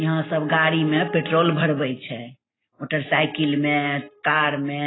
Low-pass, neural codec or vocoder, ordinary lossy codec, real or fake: 7.2 kHz; none; AAC, 16 kbps; real